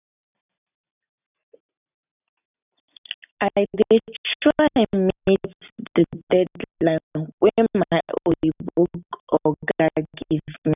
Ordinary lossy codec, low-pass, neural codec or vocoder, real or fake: none; 3.6 kHz; none; real